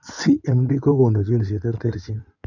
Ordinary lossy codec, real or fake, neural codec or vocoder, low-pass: none; fake; codec, 16 kHz in and 24 kHz out, 2.2 kbps, FireRedTTS-2 codec; 7.2 kHz